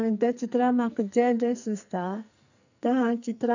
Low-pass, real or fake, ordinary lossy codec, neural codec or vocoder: 7.2 kHz; fake; none; codec, 44.1 kHz, 2.6 kbps, SNAC